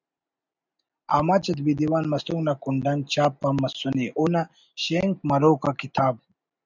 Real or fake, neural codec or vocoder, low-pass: real; none; 7.2 kHz